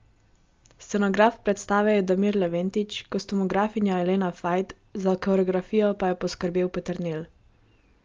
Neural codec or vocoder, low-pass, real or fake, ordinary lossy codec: none; 7.2 kHz; real; Opus, 32 kbps